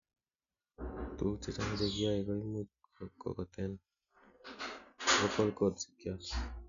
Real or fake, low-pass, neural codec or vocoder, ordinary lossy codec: real; 7.2 kHz; none; MP3, 96 kbps